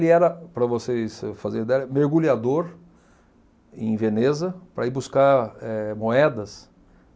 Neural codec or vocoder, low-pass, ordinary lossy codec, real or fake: none; none; none; real